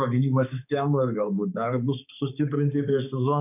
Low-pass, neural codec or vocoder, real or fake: 3.6 kHz; codec, 16 kHz, 4 kbps, X-Codec, HuBERT features, trained on balanced general audio; fake